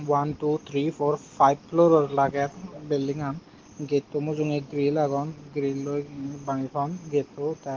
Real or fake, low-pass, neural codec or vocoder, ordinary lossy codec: real; 7.2 kHz; none; Opus, 16 kbps